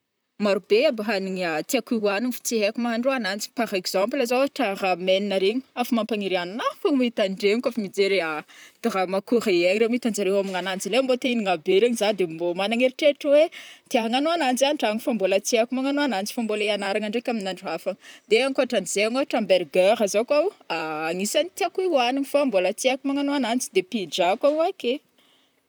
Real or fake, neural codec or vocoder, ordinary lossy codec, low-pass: fake; vocoder, 44.1 kHz, 128 mel bands, Pupu-Vocoder; none; none